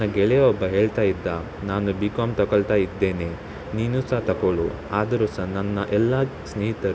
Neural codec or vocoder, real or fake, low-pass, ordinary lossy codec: none; real; none; none